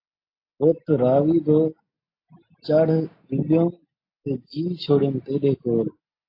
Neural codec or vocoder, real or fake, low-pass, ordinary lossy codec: none; real; 5.4 kHz; AAC, 32 kbps